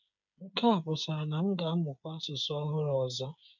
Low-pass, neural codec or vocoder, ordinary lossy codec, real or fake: 7.2 kHz; codec, 16 kHz, 8 kbps, FreqCodec, smaller model; MP3, 64 kbps; fake